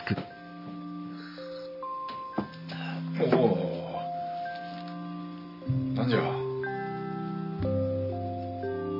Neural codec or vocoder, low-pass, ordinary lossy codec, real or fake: none; 5.4 kHz; none; real